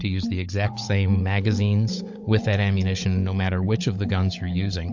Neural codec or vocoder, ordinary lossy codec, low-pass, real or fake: codec, 16 kHz, 16 kbps, FunCodec, trained on Chinese and English, 50 frames a second; MP3, 48 kbps; 7.2 kHz; fake